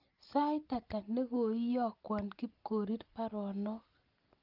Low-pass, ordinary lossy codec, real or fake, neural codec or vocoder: 5.4 kHz; Opus, 32 kbps; real; none